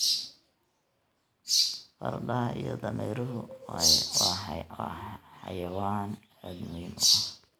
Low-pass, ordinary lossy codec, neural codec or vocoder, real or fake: none; none; none; real